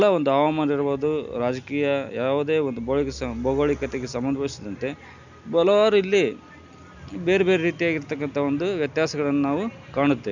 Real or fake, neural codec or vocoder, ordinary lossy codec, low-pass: real; none; none; 7.2 kHz